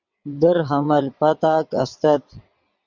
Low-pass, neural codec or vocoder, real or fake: 7.2 kHz; vocoder, 22.05 kHz, 80 mel bands, WaveNeXt; fake